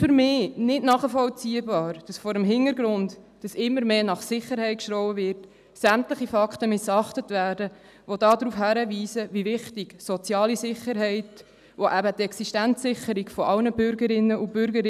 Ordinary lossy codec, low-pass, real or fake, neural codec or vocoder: none; 14.4 kHz; real; none